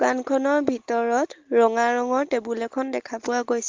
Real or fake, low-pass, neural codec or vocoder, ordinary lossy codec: real; 7.2 kHz; none; Opus, 16 kbps